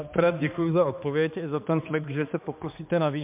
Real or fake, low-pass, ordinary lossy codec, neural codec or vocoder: fake; 3.6 kHz; MP3, 32 kbps; codec, 16 kHz, 2 kbps, X-Codec, HuBERT features, trained on balanced general audio